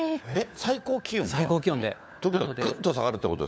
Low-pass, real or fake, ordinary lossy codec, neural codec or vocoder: none; fake; none; codec, 16 kHz, 4 kbps, FunCodec, trained on LibriTTS, 50 frames a second